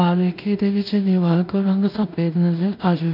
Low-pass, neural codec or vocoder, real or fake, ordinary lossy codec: 5.4 kHz; codec, 16 kHz in and 24 kHz out, 0.4 kbps, LongCat-Audio-Codec, two codebook decoder; fake; none